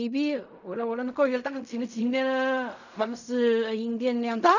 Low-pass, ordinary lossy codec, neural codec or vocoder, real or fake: 7.2 kHz; none; codec, 16 kHz in and 24 kHz out, 0.4 kbps, LongCat-Audio-Codec, fine tuned four codebook decoder; fake